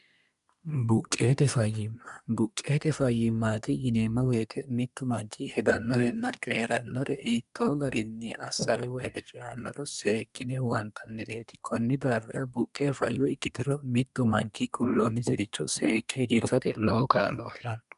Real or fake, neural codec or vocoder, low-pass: fake; codec, 24 kHz, 1 kbps, SNAC; 10.8 kHz